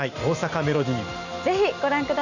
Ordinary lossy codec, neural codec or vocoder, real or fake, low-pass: none; none; real; 7.2 kHz